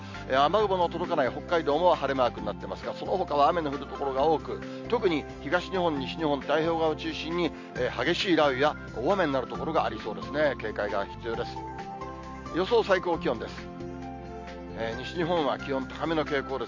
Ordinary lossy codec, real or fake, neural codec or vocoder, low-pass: MP3, 48 kbps; real; none; 7.2 kHz